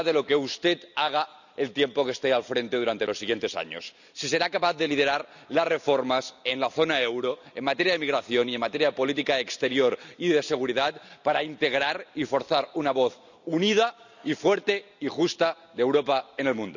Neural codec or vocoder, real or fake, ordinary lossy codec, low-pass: none; real; none; 7.2 kHz